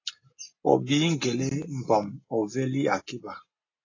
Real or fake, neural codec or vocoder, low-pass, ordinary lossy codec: real; none; 7.2 kHz; AAC, 32 kbps